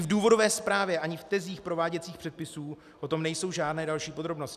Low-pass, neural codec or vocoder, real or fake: 14.4 kHz; none; real